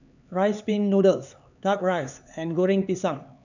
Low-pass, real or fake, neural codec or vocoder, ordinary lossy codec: 7.2 kHz; fake; codec, 16 kHz, 4 kbps, X-Codec, HuBERT features, trained on LibriSpeech; none